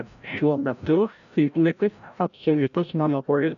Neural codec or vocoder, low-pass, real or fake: codec, 16 kHz, 0.5 kbps, FreqCodec, larger model; 7.2 kHz; fake